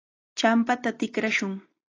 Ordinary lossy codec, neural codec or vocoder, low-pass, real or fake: AAC, 32 kbps; none; 7.2 kHz; real